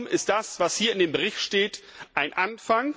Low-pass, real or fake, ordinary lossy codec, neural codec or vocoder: none; real; none; none